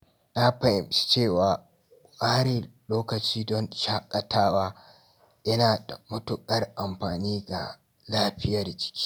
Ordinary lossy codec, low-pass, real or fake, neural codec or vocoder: none; none; real; none